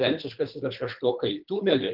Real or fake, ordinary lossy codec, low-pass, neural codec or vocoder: fake; Opus, 32 kbps; 5.4 kHz; codec, 24 kHz, 3 kbps, HILCodec